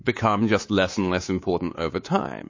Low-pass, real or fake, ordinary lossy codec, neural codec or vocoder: 7.2 kHz; fake; MP3, 32 kbps; codec, 24 kHz, 3.1 kbps, DualCodec